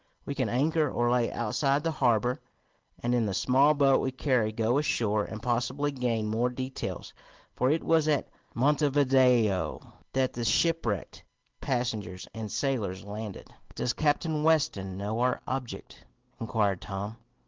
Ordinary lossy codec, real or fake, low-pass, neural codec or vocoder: Opus, 16 kbps; real; 7.2 kHz; none